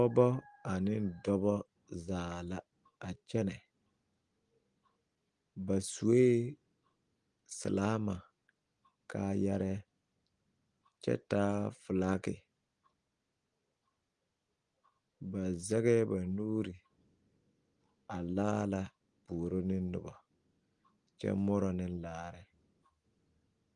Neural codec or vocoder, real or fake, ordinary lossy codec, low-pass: none; real; Opus, 24 kbps; 9.9 kHz